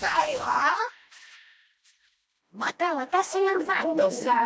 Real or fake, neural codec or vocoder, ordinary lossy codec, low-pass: fake; codec, 16 kHz, 1 kbps, FreqCodec, smaller model; none; none